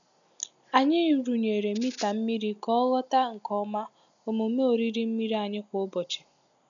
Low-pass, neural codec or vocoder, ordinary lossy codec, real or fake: 7.2 kHz; none; none; real